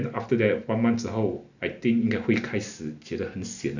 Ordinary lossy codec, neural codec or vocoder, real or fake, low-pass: none; none; real; 7.2 kHz